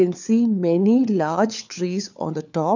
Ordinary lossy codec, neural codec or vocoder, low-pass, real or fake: none; codec, 16 kHz, 4 kbps, FunCodec, trained on LibriTTS, 50 frames a second; 7.2 kHz; fake